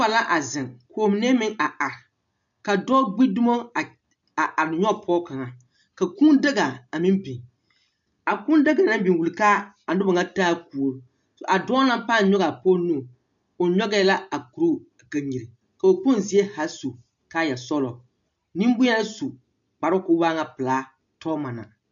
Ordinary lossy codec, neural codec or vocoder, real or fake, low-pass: MP3, 64 kbps; none; real; 7.2 kHz